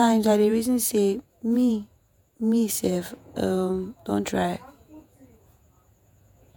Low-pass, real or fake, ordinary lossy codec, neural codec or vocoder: none; fake; none; vocoder, 48 kHz, 128 mel bands, Vocos